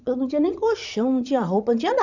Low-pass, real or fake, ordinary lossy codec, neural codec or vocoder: 7.2 kHz; fake; none; vocoder, 22.05 kHz, 80 mel bands, Vocos